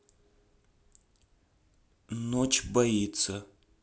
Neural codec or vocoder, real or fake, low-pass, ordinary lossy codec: none; real; none; none